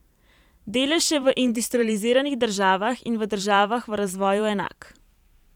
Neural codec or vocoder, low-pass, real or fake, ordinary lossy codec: vocoder, 44.1 kHz, 128 mel bands every 512 samples, BigVGAN v2; 19.8 kHz; fake; none